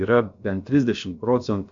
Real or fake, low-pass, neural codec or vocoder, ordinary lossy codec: fake; 7.2 kHz; codec, 16 kHz, about 1 kbps, DyCAST, with the encoder's durations; MP3, 64 kbps